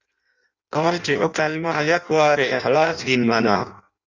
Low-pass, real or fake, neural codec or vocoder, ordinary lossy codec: 7.2 kHz; fake; codec, 16 kHz in and 24 kHz out, 0.6 kbps, FireRedTTS-2 codec; Opus, 64 kbps